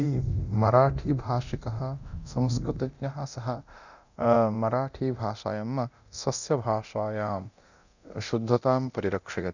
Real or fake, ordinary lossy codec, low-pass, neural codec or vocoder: fake; none; 7.2 kHz; codec, 24 kHz, 0.9 kbps, DualCodec